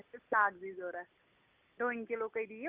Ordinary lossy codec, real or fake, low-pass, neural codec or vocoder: Opus, 24 kbps; real; 3.6 kHz; none